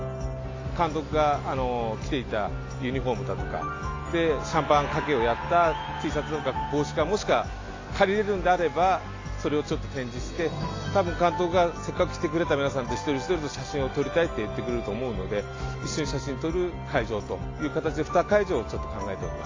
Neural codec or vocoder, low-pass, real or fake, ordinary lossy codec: none; 7.2 kHz; real; AAC, 32 kbps